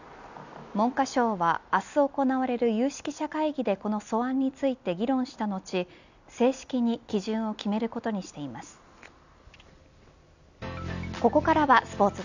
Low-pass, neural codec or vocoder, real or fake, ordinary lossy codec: 7.2 kHz; none; real; none